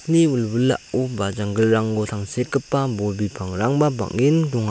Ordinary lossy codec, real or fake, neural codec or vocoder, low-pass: none; real; none; none